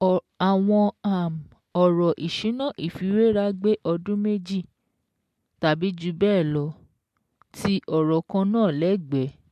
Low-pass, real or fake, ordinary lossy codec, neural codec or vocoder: 14.4 kHz; real; MP3, 64 kbps; none